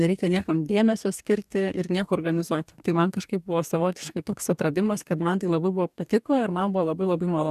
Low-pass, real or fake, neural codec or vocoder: 14.4 kHz; fake; codec, 44.1 kHz, 2.6 kbps, DAC